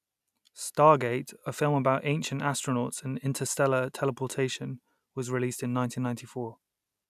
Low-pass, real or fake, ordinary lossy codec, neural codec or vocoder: 14.4 kHz; real; none; none